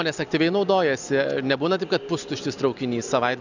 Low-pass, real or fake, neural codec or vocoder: 7.2 kHz; real; none